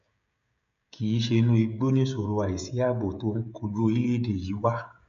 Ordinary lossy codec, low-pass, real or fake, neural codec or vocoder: none; 7.2 kHz; fake; codec, 16 kHz, 16 kbps, FreqCodec, smaller model